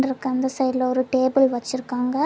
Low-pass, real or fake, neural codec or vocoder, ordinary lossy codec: none; real; none; none